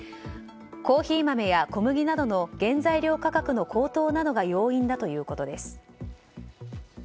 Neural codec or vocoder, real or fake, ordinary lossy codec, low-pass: none; real; none; none